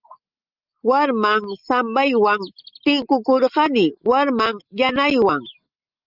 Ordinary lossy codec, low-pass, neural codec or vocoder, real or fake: Opus, 24 kbps; 5.4 kHz; none; real